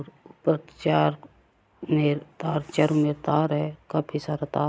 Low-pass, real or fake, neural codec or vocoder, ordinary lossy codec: none; real; none; none